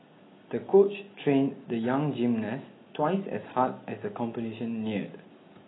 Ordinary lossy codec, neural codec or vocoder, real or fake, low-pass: AAC, 16 kbps; none; real; 7.2 kHz